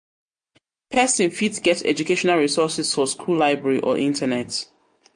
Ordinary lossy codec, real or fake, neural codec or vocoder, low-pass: MP3, 48 kbps; real; none; 9.9 kHz